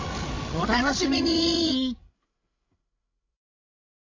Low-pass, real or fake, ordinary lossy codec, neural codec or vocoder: 7.2 kHz; fake; AAC, 32 kbps; codec, 16 kHz, 16 kbps, FreqCodec, larger model